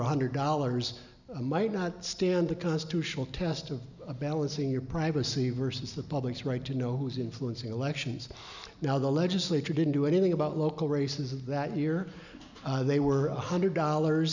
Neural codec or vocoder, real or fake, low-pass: none; real; 7.2 kHz